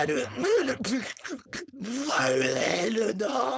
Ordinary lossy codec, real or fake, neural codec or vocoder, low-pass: none; fake; codec, 16 kHz, 4.8 kbps, FACodec; none